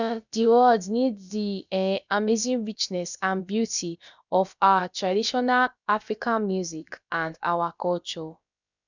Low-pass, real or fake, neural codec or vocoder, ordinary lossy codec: 7.2 kHz; fake; codec, 16 kHz, about 1 kbps, DyCAST, with the encoder's durations; none